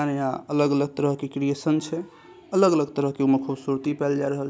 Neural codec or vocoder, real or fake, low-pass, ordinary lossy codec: none; real; none; none